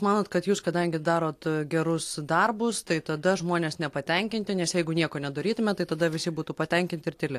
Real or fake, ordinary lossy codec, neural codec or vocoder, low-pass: real; AAC, 64 kbps; none; 14.4 kHz